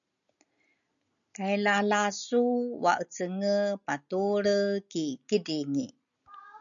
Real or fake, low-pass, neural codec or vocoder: real; 7.2 kHz; none